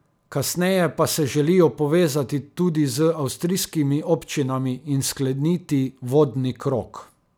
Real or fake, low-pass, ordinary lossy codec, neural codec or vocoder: real; none; none; none